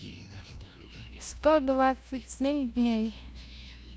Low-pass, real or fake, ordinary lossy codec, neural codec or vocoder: none; fake; none; codec, 16 kHz, 0.5 kbps, FunCodec, trained on LibriTTS, 25 frames a second